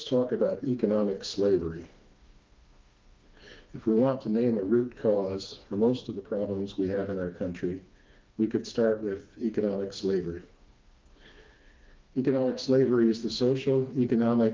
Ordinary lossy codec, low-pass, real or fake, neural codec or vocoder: Opus, 24 kbps; 7.2 kHz; fake; codec, 16 kHz, 2 kbps, FreqCodec, smaller model